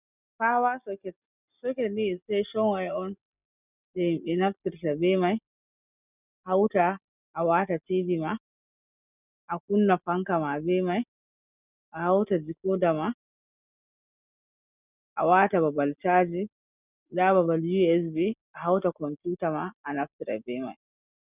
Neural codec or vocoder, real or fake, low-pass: none; real; 3.6 kHz